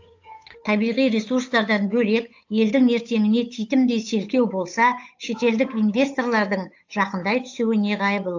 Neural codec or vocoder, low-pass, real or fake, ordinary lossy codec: codec, 16 kHz, 8 kbps, FunCodec, trained on Chinese and English, 25 frames a second; 7.2 kHz; fake; AAC, 48 kbps